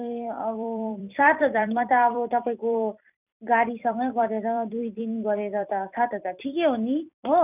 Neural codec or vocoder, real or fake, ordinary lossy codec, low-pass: vocoder, 44.1 kHz, 128 mel bands every 256 samples, BigVGAN v2; fake; none; 3.6 kHz